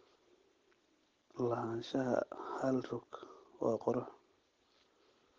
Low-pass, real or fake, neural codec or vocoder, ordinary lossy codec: 7.2 kHz; real; none; Opus, 16 kbps